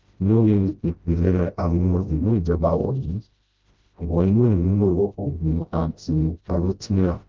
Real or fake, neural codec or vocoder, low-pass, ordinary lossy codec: fake; codec, 16 kHz, 0.5 kbps, FreqCodec, smaller model; 7.2 kHz; Opus, 24 kbps